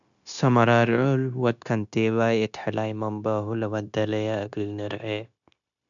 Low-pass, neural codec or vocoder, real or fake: 7.2 kHz; codec, 16 kHz, 0.9 kbps, LongCat-Audio-Codec; fake